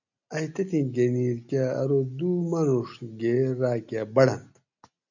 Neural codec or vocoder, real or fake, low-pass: none; real; 7.2 kHz